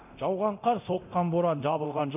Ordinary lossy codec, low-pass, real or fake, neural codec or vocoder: none; 3.6 kHz; fake; codec, 24 kHz, 0.9 kbps, DualCodec